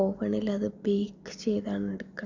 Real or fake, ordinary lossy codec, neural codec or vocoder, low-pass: real; none; none; 7.2 kHz